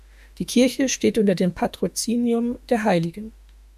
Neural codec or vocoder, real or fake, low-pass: autoencoder, 48 kHz, 32 numbers a frame, DAC-VAE, trained on Japanese speech; fake; 14.4 kHz